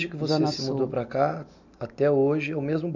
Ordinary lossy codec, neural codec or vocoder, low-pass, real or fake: none; none; 7.2 kHz; real